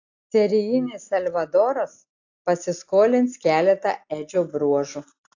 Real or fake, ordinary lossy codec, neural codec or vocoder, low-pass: real; AAC, 48 kbps; none; 7.2 kHz